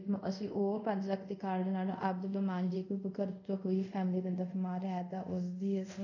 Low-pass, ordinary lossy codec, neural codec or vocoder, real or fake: 7.2 kHz; none; codec, 24 kHz, 0.5 kbps, DualCodec; fake